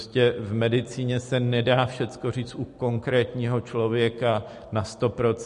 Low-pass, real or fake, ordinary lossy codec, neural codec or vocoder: 14.4 kHz; real; MP3, 48 kbps; none